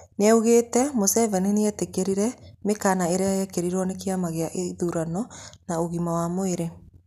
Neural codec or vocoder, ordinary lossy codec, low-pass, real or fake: none; none; 14.4 kHz; real